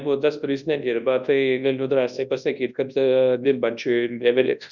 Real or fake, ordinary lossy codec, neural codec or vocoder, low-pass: fake; Opus, 64 kbps; codec, 24 kHz, 0.9 kbps, WavTokenizer, large speech release; 7.2 kHz